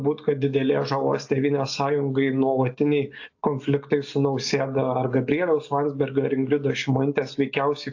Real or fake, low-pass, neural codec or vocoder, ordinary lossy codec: real; 7.2 kHz; none; AAC, 48 kbps